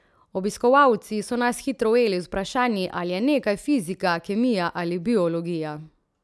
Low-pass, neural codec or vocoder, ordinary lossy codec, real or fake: none; none; none; real